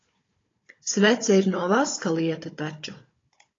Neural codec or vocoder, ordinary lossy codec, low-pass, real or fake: codec, 16 kHz, 16 kbps, FunCodec, trained on Chinese and English, 50 frames a second; AAC, 32 kbps; 7.2 kHz; fake